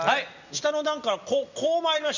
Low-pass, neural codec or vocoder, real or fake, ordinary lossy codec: 7.2 kHz; none; real; none